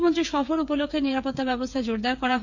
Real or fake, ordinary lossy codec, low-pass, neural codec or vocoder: fake; none; 7.2 kHz; codec, 16 kHz, 8 kbps, FreqCodec, smaller model